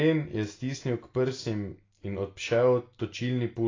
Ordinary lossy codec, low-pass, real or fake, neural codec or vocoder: AAC, 32 kbps; 7.2 kHz; real; none